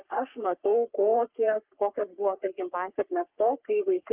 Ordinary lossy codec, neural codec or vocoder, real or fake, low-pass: Opus, 24 kbps; codec, 44.1 kHz, 3.4 kbps, Pupu-Codec; fake; 3.6 kHz